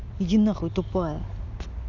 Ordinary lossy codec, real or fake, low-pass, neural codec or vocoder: none; real; 7.2 kHz; none